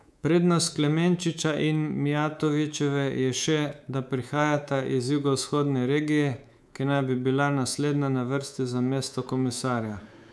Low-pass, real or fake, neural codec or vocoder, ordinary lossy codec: none; fake; codec, 24 kHz, 3.1 kbps, DualCodec; none